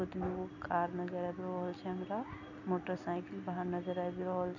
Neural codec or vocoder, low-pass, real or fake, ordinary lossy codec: none; 7.2 kHz; real; none